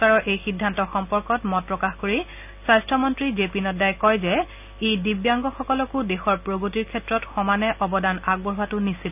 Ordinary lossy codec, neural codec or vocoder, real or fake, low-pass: none; none; real; 3.6 kHz